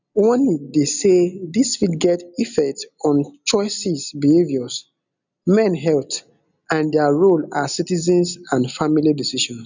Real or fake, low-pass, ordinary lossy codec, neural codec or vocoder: real; 7.2 kHz; none; none